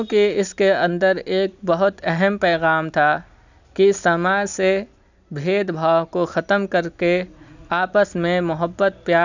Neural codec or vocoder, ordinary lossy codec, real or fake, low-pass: none; none; real; 7.2 kHz